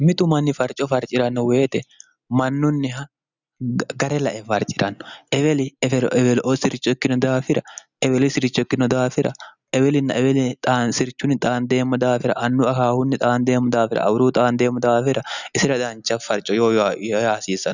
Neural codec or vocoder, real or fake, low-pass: none; real; 7.2 kHz